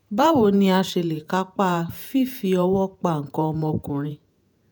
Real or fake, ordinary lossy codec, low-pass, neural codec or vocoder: fake; none; none; vocoder, 48 kHz, 128 mel bands, Vocos